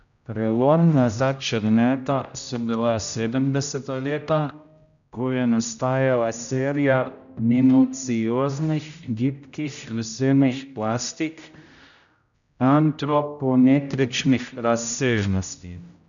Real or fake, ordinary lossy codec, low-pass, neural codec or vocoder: fake; none; 7.2 kHz; codec, 16 kHz, 0.5 kbps, X-Codec, HuBERT features, trained on general audio